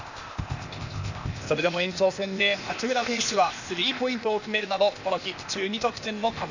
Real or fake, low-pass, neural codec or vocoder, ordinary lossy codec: fake; 7.2 kHz; codec, 16 kHz, 0.8 kbps, ZipCodec; none